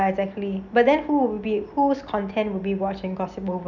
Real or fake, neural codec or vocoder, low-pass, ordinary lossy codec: real; none; 7.2 kHz; none